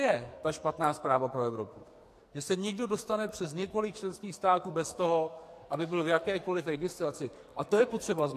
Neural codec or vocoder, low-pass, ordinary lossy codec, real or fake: codec, 44.1 kHz, 2.6 kbps, SNAC; 14.4 kHz; AAC, 64 kbps; fake